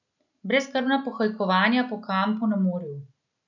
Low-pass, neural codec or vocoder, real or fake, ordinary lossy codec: 7.2 kHz; none; real; none